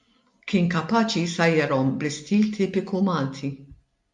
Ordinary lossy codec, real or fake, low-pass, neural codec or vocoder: MP3, 64 kbps; real; 10.8 kHz; none